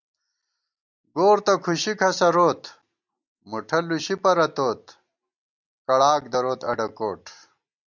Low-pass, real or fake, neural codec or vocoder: 7.2 kHz; real; none